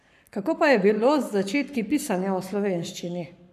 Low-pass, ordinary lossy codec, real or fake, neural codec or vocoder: 14.4 kHz; none; fake; codec, 44.1 kHz, 7.8 kbps, Pupu-Codec